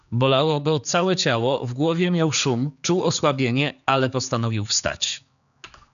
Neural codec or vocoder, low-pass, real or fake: codec, 16 kHz, 4 kbps, X-Codec, HuBERT features, trained on general audio; 7.2 kHz; fake